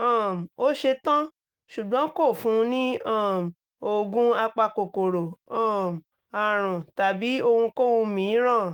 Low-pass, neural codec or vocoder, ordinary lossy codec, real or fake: 19.8 kHz; autoencoder, 48 kHz, 128 numbers a frame, DAC-VAE, trained on Japanese speech; Opus, 24 kbps; fake